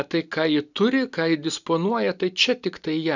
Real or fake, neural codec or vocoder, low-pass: real; none; 7.2 kHz